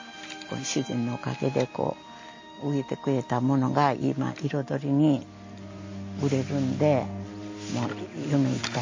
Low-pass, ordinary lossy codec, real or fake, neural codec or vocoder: 7.2 kHz; MP3, 32 kbps; real; none